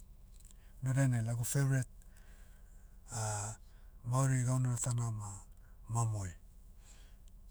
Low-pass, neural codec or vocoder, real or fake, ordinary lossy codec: none; autoencoder, 48 kHz, 128 numbers a frame, DAC-VAE, trained on Japanese speech; fake; none